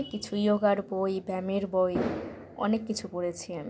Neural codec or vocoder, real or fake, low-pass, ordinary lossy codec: none; real; none; none